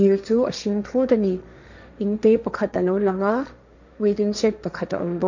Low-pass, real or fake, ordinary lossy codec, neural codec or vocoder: 7.2 kHz; fake; none; codec, 16 kHz, 1.1 kbps, Voila-Tokenizer